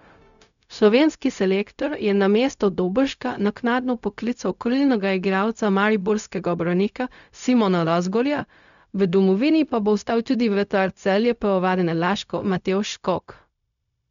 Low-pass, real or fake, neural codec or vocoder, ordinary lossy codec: 7.2 kHz; fake; codec, 16 kHz, 0.4 kbps, LongCat-Audio-Codec; none